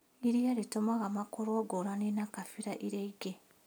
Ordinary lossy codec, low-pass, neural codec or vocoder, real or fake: none; none; none; real